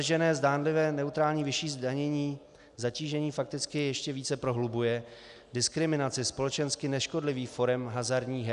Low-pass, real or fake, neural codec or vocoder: 10.8 kHz; real; none